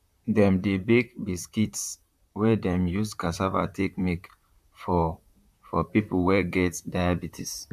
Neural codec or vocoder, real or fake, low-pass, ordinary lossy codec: vocoder, 44.1 kHz, 128 mel bands, Pupu-Vocoder; fake; 14.4 kHz; none